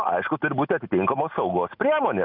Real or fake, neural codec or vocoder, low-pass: real; none; 5.4 kHz